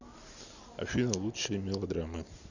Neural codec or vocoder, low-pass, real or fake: none; 7.2 kHz; real